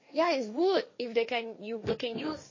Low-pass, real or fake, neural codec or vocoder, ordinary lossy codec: 7.2 kHz; fake; codec, 16 kHz, 1.1 kbps, Voila-Tokenizer; MP3, 32 kbps